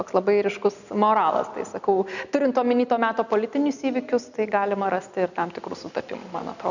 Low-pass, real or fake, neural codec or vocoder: 7.2 kHz; real; none